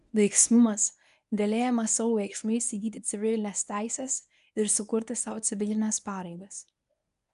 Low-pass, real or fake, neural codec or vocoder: 10.8 kHz; fake; codec, 24 kHz, 0.9 kbps, WavTokenizer, medium speech release version 1